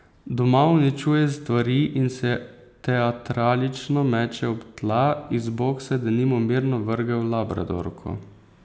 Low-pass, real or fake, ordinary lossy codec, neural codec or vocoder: none; real; none; none